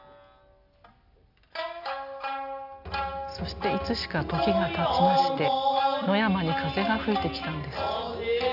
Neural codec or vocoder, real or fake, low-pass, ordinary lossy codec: none; real; 5.4 kHz; AAC, 48 kbps